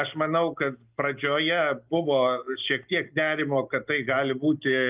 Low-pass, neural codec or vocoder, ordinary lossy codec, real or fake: 3.6 kHz; none; Opus, 24 kbps; real